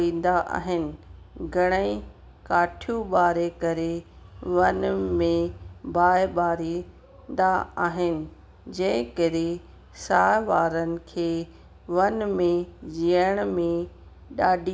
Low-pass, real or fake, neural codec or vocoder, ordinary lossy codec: none; real; none; none